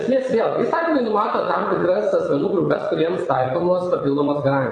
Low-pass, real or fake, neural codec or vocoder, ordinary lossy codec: 9.9 kHz; fake; vocoder, 22.05 kHz, 80 mel bands, Vocos; AAC, 32 kbps